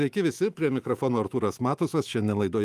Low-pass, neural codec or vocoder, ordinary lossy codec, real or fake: 14.4 kHz; autoencoder, 48 kHz, 128 numbers a frame, DAC-VAE, trained on Japanese speech; Opus, 32 kbps; fake